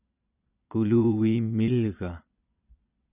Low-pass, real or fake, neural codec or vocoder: 3.6 kHz; fake; vocoder, 22.05 kHz, 80 mel bands, Vocos